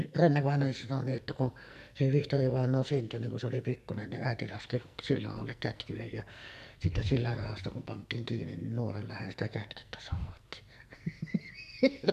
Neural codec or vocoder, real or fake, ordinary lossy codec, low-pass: codec, 44.1 kHz, 2.6 kbps, SNAC; fake; none; 14.4 kHz